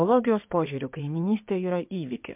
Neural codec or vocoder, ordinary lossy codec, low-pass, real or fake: codec, 16 kHz in and 24 kHz out, 2.2 kbps, FireRedTTS-2 codec; MP3, 32 kbps; 3.6 kHz; fake